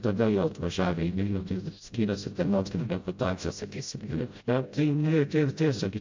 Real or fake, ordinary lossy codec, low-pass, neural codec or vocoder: fake; MP3, 48 kbps; 7.2 kHz; codec, 16 kHz, 0.5 kbps, FreqCodec, smaller model